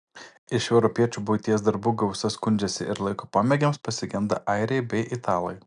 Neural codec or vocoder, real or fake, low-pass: none; real; 9.9 kHz